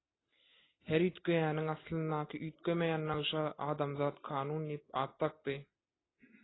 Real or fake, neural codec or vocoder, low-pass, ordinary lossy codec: real; none; 7.2 kHz; AAC, 16 kbps